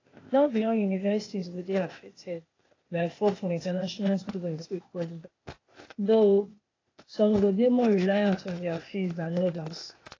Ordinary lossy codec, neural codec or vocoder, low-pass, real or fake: AAC, 32 kbps; codec, 16 kHz, 0.8 kbps, ZipCodec; 7.2 kHz; fake